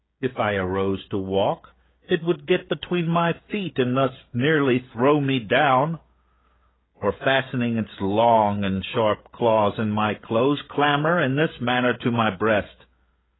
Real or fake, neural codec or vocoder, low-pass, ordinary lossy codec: fake; codec, 16 kHz, 16 kbps, FreqCodec, smaller model; 7.2 kHz; AAC, 16 kbps